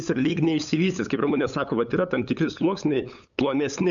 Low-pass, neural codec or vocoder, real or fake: 7.2 kHz; codec, 16 kHz, 8 kbps, FunCodec, trained on LibriTTS, 25 frames a second; fake